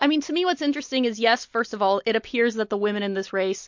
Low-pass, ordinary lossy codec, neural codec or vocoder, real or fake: 7.2 kHz; MP3, 48 kbps; none; real